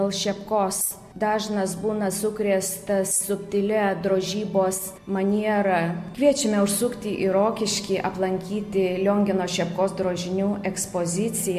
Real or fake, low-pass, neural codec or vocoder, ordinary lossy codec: real; 14.4 kHz; none; MP3, 64 kbps